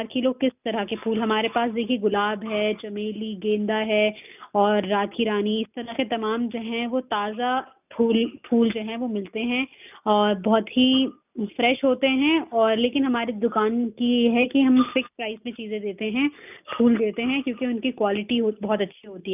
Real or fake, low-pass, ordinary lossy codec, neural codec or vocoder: real; 3.6 kHz; none; none